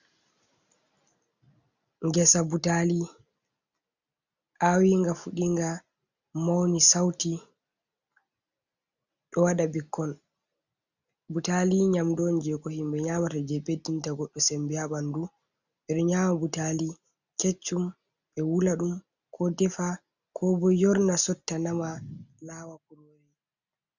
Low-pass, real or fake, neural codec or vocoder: 7.2 kHz; real; none